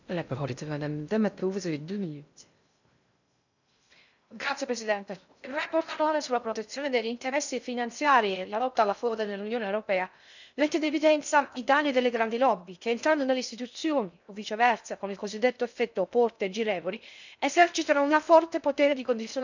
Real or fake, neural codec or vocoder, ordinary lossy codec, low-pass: fake; codec, 16 kHz in and 24 kHz out, 0.6 kbps, FocalCodec, streaming, 2048 codes; none; 7.2 kHz